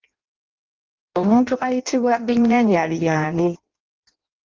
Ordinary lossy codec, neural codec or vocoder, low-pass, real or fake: Opus, 32 kbps; codec, 16 kHz in and 24 kHz out, 0.6 kbps, FireRedTTS-2 codec; 7.2 kHz; fake